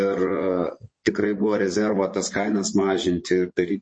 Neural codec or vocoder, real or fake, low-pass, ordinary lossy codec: vocoder, 22.05 kHz, 80 mel bands, Vocos; fake; 9.9 kHz; MP3, 32 kbps